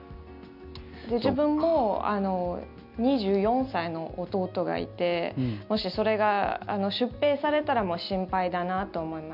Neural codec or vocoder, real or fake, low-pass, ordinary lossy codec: none; real; 5.4 kHz; none